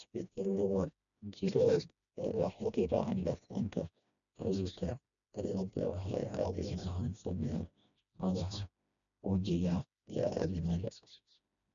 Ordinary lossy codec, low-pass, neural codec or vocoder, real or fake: none; 7.2 kHz; codec, 16 kHz, 1 kbps, FreqCodec, smaller model; fake